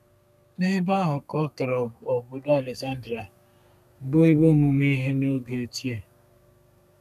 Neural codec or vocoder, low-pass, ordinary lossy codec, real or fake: codec, 32 kHz, 1.9 kbps, SNAC; 14.4 kHz; none; fake